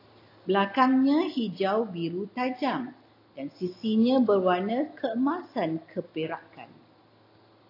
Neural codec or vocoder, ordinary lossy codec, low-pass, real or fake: none; AAC, 32 kbps; 5.4 kHz; real